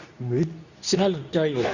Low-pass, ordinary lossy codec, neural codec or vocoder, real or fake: 7.2 kHz; none; codec, 24 kHz, 0.9 kbps, WavTokenizer, medium speech release version 2; fake